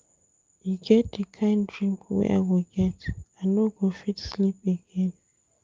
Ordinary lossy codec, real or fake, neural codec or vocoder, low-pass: Opus, 32 kbps; real; none; 7.2 kHz